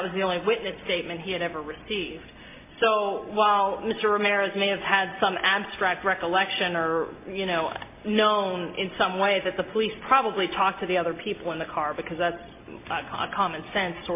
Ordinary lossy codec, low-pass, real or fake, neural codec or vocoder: MP3, 24 kbps; 3.6 kHz; real; none